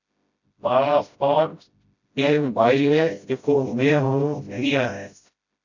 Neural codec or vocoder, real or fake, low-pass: codec, 16 kHz, 0.5 kbps, FreqCodec, smaller model; fake; 7.2 kHz